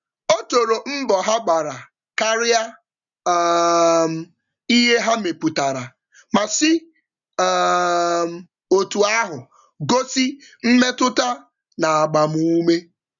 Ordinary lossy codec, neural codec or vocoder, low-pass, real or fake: none; none; 7.2 kHz; real